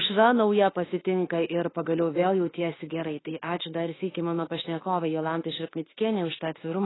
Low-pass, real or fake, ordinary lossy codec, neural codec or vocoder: 7.2 kHz; fake; AAC, 16 kbps; autoencoder, 48 kHz, 32 numbers a frame, DAC-VAE, trained on Japanese speech